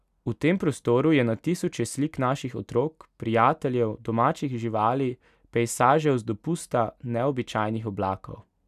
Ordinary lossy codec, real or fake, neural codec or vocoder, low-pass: none; real; none; 14.4 kHz